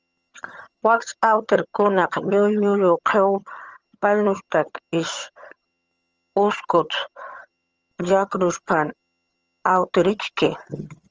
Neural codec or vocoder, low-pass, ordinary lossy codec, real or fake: vocoder, 22.05 kHz, 80 mel bands, HiFi-GAN; 7.2 kHz; Opus, 24 kbps; fake